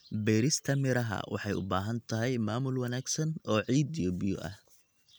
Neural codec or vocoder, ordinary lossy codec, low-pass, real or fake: none; none; none; real